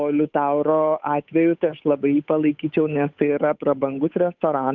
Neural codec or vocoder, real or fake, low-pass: codec, 16 kHz, 8 kbps, FunCodec, trained on Chinese and English, 25 frames a second; fake; 7.2 kHz